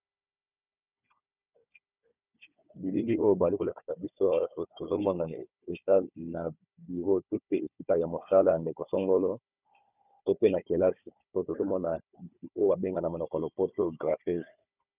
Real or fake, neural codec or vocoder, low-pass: fake; codec, 16 kHz, 4 kbps, FunCodec, trained on Chinese and English, 50 frames a second; 3.6 kHz